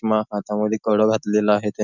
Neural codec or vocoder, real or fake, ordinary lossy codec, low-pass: none; real; none; 7.2 kHz